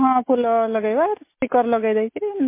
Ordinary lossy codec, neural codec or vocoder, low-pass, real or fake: MP3, 24 kbps; none; 3.6 kHz; real